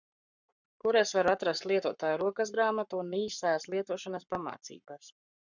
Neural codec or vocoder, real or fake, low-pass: codec, 44.1 kHz, 7.8 kbps, Pupu-Codec; fake; 7.2 kHz